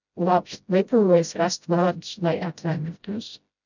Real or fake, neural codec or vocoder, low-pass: fake; codec, 16 kHz, 0.5 kbps, FreqCodec, smaller model; 7.2 kHz